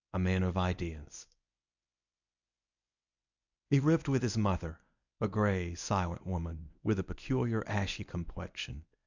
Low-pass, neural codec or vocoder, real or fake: 7.2 kHz; codec, 24 kHz, 0.9 kbps, WavTokenizer, medium speech release version 1; fake